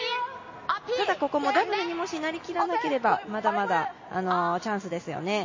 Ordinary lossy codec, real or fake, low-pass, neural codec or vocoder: MP3, 32 kbps; real; 7.2 kHz; none